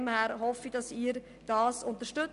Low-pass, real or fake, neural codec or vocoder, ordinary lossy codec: 10.8 kHz; real; none; none